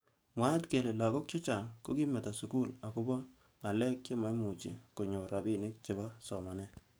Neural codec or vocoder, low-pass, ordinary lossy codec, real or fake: codec, 44.1 kHz, 7.8 kbps, DAC; none; none; fake